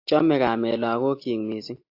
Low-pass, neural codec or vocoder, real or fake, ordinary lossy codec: 5.4 kHz; none; real; AAC, 32 kbps